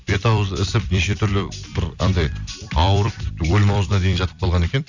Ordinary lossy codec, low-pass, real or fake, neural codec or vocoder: none; 7.2 kHz; fake; vocoder, 44.1 kHz, 128 mel bands every 256 samples, BigVGAN v2